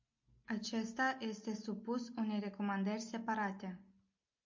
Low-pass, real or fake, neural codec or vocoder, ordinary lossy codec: 7.2 kHz; real; none; MP3, 48 kbps